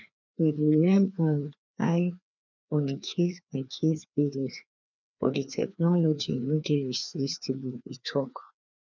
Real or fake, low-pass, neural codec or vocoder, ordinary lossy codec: fake; 7.2 kHz; codec, 16 kHz, 2 kbps, FreqCodec, larger model; none